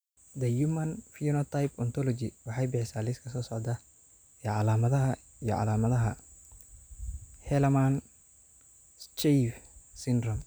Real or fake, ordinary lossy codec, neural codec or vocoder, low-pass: real; none; none; none